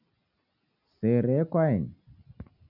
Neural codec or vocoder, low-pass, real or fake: none; 5.4 kHz; real